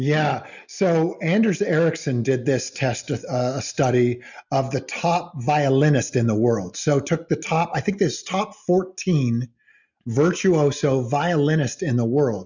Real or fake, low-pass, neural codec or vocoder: real; 7.2 kHz; none